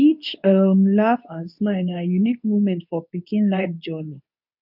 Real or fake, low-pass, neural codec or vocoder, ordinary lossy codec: fake; 5.4 kHz; codec, 24 kHz, 0.9 kbps, WavTokenizer, medium speech release version 2; none